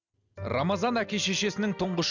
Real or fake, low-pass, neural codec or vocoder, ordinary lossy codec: real; 7.2 kHz; none; none